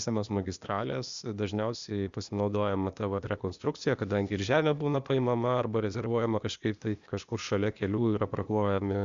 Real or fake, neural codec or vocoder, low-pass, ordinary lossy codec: fake; codec, 16 kHz, 0.8 kbps, ZipCodec; 7.2 kHz; Opus, 64 kbps